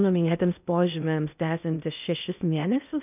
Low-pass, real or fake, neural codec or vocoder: 3.6 kHz; fake; codec, 16 kHz in and 24 kHz out, 0.6 kbps, FocalCodec, streaming, 4096 codes